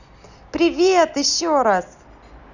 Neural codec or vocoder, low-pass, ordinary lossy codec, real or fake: none; 7.2 kHz; none; real